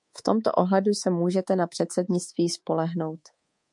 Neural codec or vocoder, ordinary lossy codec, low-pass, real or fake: codec, 24 kHz, 3.1 kbps, DualCodec; MP3, 64 kbps; 10.8 kHz; fake